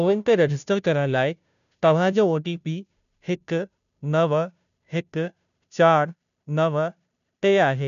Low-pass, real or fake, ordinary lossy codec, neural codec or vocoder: 7.2 kHz; fake; none; codec, 16 kHz, 0.5 kbps, FunCodec, trained on Chinese and English, 25 frames a second